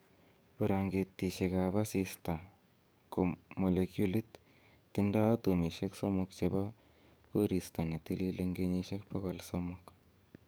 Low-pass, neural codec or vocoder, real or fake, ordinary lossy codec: none; codec, 44.1 kHz, 7.8 kbps, DAC; fake; none